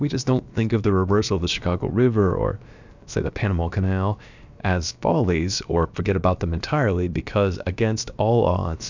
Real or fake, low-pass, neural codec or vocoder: fake; 7.2 kHz; codec, 16 kHz, about 1 kbps, DyCAST, with the encoder's durations